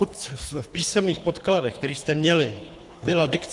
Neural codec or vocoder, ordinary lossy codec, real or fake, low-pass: codec, 24 kHz, 3 kbps, HILCodec; AAC, 64 kbps; fake; 10.8 kHz